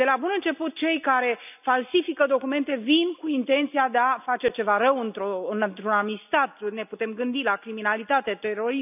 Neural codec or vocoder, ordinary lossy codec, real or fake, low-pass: none; none; real; 3.6 kHz